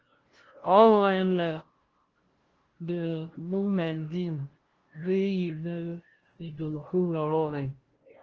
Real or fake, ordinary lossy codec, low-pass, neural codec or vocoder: fake; Opus, 16 kbps; 7.2 kHz; codec, 16 kHz, 0.5 kbps, FunCodec, trained on LibriTTS, 25 frames a second